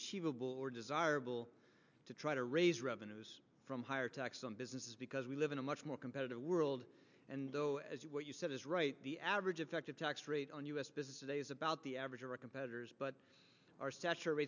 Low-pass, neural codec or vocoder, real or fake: 7.2 kHz; none; real